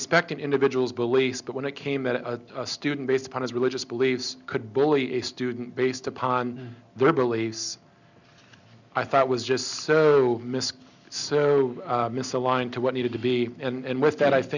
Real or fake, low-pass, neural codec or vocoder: real; 7.2 kHz; none